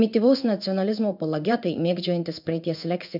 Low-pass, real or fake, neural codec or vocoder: 5.4 kHz; fake; codec, 16 kHz in and 24 kHz out, 1 kbps, XY-Tokenizer